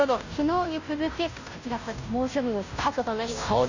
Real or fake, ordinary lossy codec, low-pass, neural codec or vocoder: fake; none; 7.2 kHz; codec, 16 kHz, 0.5 kbps, FunCodec, trained on Chinese and English, 25 frames a second